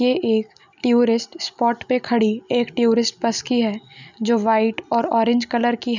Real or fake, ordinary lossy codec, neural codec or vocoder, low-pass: real; none; none; 7.2 kHz